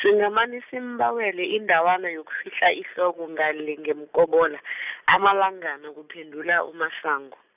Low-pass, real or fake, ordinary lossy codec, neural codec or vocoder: 3.6 kHz; real; none; none